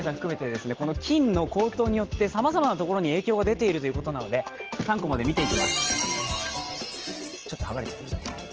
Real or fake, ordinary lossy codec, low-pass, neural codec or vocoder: real; Opus, 16 kbps; 7.2 kHz; none